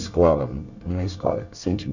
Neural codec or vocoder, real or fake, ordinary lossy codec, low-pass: codec, 24 kHz, 1 kbps, SNAC; fake; none; 7.2 kHz